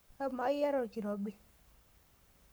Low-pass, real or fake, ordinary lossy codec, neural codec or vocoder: none; fake; none; codec, 44.1 kHz, 7.8 kbps, Pupu-Codec